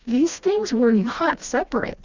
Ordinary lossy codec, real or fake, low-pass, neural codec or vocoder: Opus, 64 kbps; fake; 7.2 kHz; codec, 16 kHz, 1 kbps, FreqCodec, smaller model